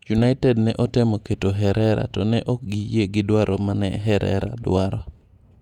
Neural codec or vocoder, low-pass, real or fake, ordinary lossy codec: vocoder, 48 kHz, 128 mel bands, Vocos; 19.8 kHz; fake; none